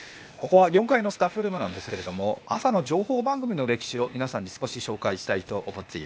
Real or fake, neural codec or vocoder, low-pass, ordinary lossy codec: fake; codec, 16 kHz, 0.8 kbps, ZipCodec; none; none